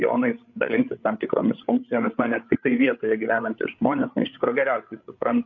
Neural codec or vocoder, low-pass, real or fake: codec, 16 kHz, 8 kbps, FreqCodec, larger model; 7.2 kHz; fake